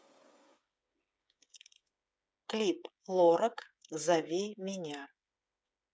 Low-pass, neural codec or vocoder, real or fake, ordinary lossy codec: none; codec, 16 kHz, 8 kbps, FreqCodec, smaller model; fake; none